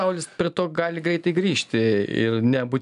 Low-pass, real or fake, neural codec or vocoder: 10.8 kHz; real; none